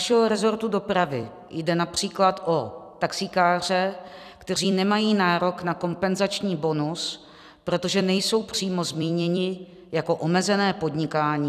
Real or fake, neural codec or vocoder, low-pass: fake; vocoder, 44.1 kHz, 128 mel bands every 256 samples, BigVGAN v2; 14.4 kHz